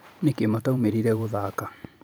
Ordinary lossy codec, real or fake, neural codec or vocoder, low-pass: none; fake; vocoder, 44.1 kHz, 128 mel bands every 512 samples, BigVGAN v2; none